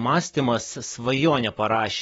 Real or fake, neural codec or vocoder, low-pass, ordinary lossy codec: fake; vocoder, 44.1 kHz, 128 mel bands, Pupu-Vocoder; 19.8 kHz; AAC, 24 kbps